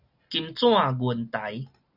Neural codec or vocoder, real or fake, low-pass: none; real; 5.4 kHz